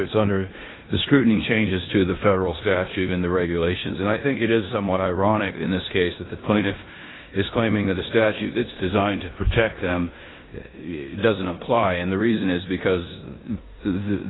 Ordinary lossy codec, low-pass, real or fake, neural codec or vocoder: AAC, 16 kbps; 7.2 kHz; fake; codec, 16 kHz in and 24 kHz out, 0.9 kbps, LongCat-Audio-Codec, four codebook decoder